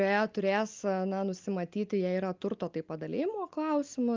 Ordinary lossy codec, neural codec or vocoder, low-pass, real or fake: Opus, 32 kbps; none; 7.2 kHz; real